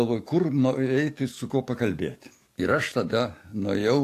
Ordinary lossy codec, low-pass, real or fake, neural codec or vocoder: AAC, 64 kbps; 14.4 kHz; fake; codec, 44.1 kHz, 7.8 kbps, DAC